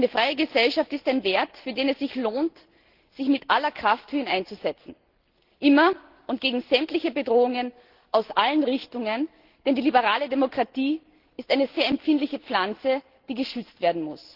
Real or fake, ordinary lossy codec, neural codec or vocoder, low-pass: real; Opus, 16 kbps; none; 5.4 kHz